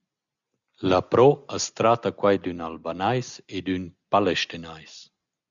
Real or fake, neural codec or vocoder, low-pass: real; none; 7.2 kHz